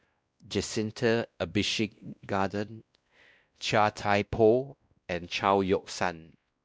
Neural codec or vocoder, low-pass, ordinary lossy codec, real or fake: codec, 16 kHz, 1 kbps, X-Codec, WavLM features, trained on Multilingual LibriSpeech; none; none; fake